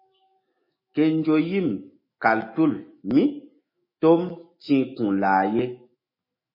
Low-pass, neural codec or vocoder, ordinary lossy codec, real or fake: 5.4 kHz; autoencoder, 48 kHz, 128 numbers a frame, DAC-VAE, trained on Japanese speech; MP3, 24 kbps; fake